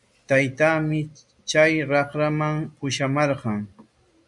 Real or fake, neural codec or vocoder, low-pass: real; none; 10.8 kHz